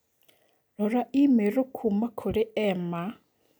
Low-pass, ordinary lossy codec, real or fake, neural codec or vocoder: none; none; real; none